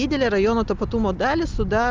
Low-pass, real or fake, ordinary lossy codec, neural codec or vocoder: 7.2 kHz; real; Opus, 32 kbps; none